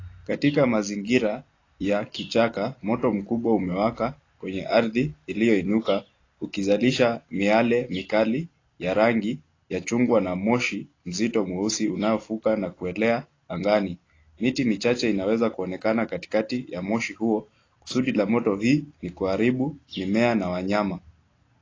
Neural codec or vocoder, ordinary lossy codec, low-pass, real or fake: none; AAC, 32 kbps; 7.2 kHz; real